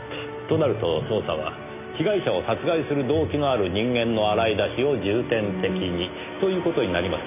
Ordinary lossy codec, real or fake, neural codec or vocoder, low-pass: none; real; none; 3.6 kHz